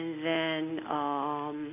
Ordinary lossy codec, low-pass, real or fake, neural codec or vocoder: AAC, 24 kbps; 3.6 kHz; real; none